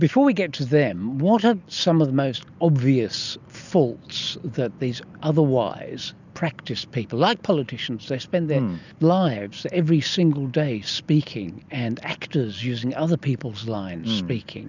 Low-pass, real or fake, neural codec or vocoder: 7.2 kHz; real; none